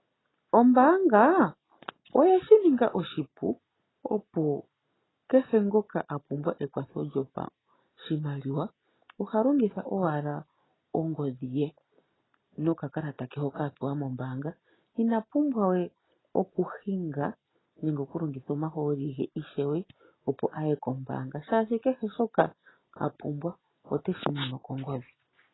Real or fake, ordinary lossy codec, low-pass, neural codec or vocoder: real; AAC, 16 kbps; 7.2 kHz; none